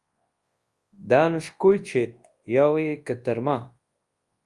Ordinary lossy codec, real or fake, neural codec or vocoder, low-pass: Opus, 32 kbps; fake; codec, 24 kHz, 0.9 kbps, WavTokenizer, large speech release; 10.8 kHz